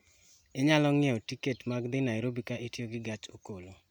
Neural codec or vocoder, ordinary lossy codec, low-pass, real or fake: none; none; 19.8 kHz; real